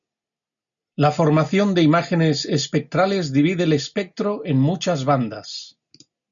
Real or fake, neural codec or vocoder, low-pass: real; none; 7.2 kHz